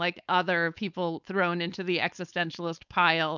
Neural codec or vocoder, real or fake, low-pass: codec, 16 kHz, 4.8 kbps, FACodec; fake; 7.2 kHz